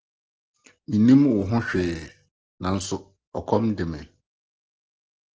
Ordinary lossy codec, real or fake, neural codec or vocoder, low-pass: Opus, 16 kbps; real; none; 7.2 kHz